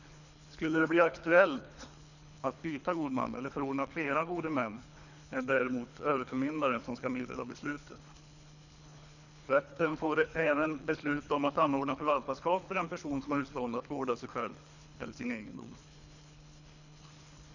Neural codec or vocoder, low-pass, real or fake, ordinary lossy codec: codec, 24 kHz, 3 kbps, HILCodec; 7.2 kHz; fake; none